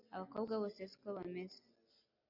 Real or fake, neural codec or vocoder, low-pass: real; none; 5.4 kHz